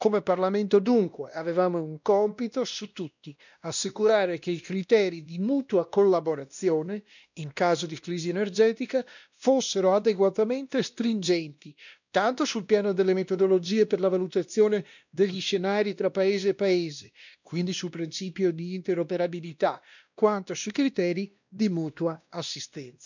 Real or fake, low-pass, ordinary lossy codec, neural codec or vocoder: fake; 7.2 kHz; none; codec, 16 kHz, 1 kbps, X-Codec, WavLM features, trained on Multilingual LibriSpeech